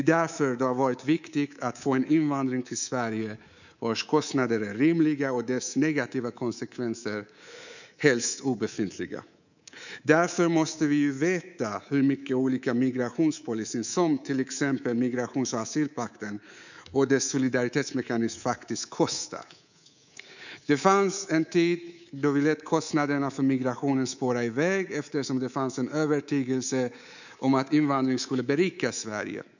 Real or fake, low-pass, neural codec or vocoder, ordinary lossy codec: fake; 7.2 kHz; codec, 24 kHz, 3.1 kbps, DualCodec; none